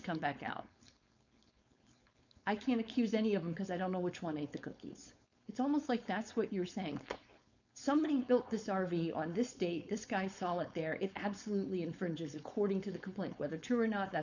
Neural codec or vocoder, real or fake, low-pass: codec, 16 kHz, 4.8 kbps, FACodec; fake; 7.2 kHz